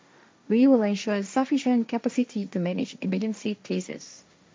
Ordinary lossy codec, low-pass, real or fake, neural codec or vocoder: none; none; fake; codec, 16 kHz, 1.1 kbps, Voila-Tokenizer